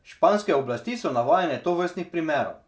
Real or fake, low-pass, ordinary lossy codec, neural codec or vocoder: real; none; none; none